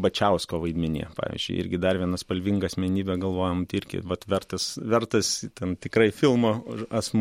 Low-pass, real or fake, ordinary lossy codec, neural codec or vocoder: 19.8 kHz; real; MP3, 64 kbps; none